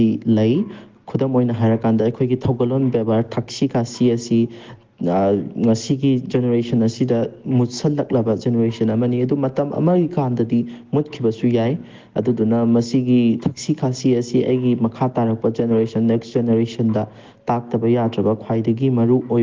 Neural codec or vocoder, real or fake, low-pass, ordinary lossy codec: none; real; 7.2 kHz; Opus, 16 kbps